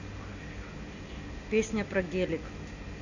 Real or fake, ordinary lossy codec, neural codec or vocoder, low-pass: real; none; none; 7.2 kHz